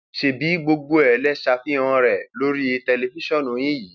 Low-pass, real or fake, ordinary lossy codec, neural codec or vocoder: 7.2 kHz; real; none; none